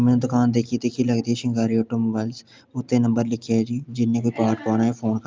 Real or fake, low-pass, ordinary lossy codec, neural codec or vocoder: real; 7.2 kHz; Opus, 24 kbps; none